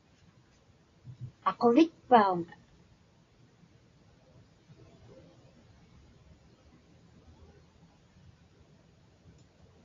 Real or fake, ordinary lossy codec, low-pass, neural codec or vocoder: real; AAC, 32 kbps; 7.2 kHz; none